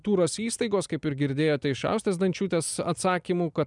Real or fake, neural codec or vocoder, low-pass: real; none; 10.8 kHz